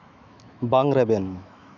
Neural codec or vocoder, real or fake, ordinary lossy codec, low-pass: autoencoder, 48 kHz, 128 numbers a frame, DAC-VAE, trained on Japanese speech; fake; none; 7.2 kHz